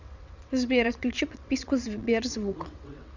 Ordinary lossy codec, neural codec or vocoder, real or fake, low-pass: none; vocoder, 22.05 kHz, 80 mel bands, WaveNeXt; fake; 7.2 kHz